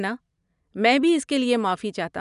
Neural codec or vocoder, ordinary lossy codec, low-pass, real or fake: none; none; 10.8 kHz; real